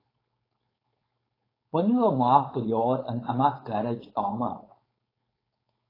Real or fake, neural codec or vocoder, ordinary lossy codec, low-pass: fake; codec, 16 kHz, 4.8 kbps, FACodec; AAC, 24 kbps; 5.4 kHz